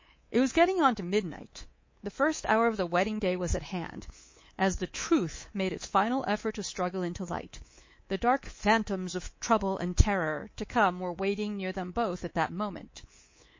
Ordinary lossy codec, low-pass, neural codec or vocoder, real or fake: MP3, 32 kbps; 7.2 kHz; codec, 24 kHz, 3.1 kbps, DualCodec; fake